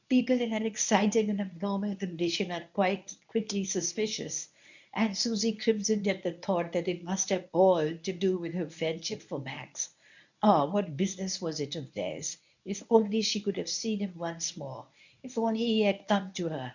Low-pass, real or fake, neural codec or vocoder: 7.2 kHz; fake; codec, 24 kHz, 0.9 kbps, WavTokenizer, medium speech release version 1